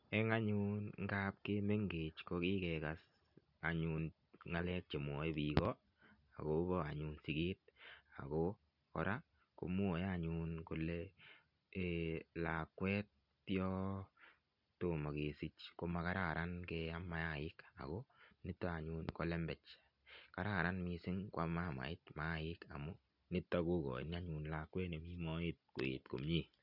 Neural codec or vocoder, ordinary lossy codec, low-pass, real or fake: none; none; 5.4 kHz; real